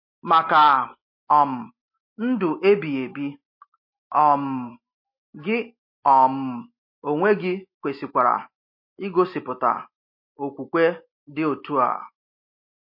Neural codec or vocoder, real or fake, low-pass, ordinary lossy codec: none; real; 5.4 kHz; MP3, 32 kbps